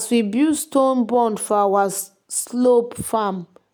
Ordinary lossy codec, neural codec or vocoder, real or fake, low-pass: none; none; real; none